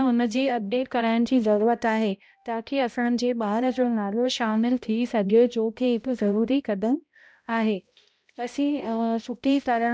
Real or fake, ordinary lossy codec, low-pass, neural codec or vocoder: fake; none; none; codec, 16 kHz, 0.5 kbps, X-Codec, HuBERT features, trained on balanced general audio